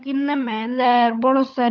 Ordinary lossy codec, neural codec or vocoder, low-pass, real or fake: none; codec, 16 kHz, 16 kbps, FunCodec, trained on LibriTTS, 50 frames a second; none; fake